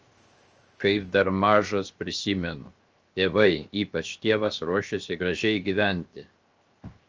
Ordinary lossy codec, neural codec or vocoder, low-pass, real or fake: Opus, 24 kbps; codec, 16 kHz, 0.7 kbps, FocalCodec; 7.2 kHz; fake